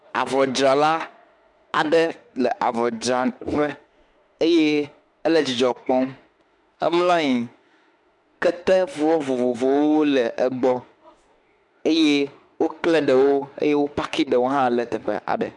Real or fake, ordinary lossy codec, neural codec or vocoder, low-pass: fake; MP3, 96 kbps; autoencoder, 48 kHz, 32 numbers a frame, DAC-VAE, trained on Japanese speech; 10.8 kHz